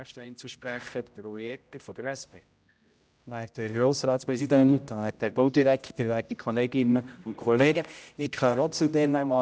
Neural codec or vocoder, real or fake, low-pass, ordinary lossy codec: codec, 16 kHz, 0.5 kbps, X-Codec, HuBERT features, trained on general audio; fake; none; none